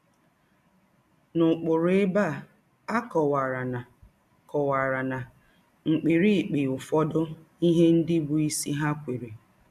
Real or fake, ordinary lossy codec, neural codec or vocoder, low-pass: real; none; none; 14.4 kHz